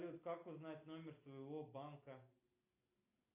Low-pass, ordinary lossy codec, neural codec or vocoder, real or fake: 3.6 kHz; AAC, 32 kbps; none; real